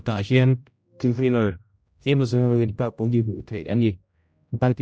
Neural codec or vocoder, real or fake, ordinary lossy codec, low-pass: codec, 16 kHz, 0.5 kbps, X-Codec, HuBERT features, trained on general audio; fake; none; none